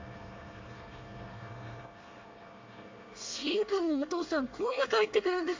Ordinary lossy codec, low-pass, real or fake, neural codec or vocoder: MP3, 64 kbps; 7.2 kHz; fake; codec, 24 kHz, 1 kbps, SNAC